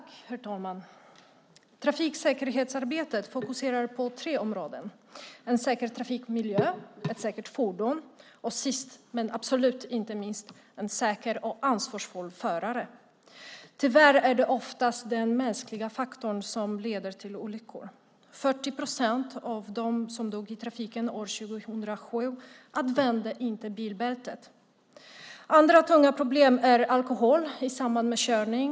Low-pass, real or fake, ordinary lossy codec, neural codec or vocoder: none; real; none; none